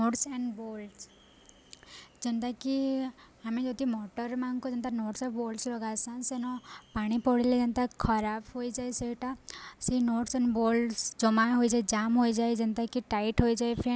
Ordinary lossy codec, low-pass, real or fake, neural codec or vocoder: none; none; real; none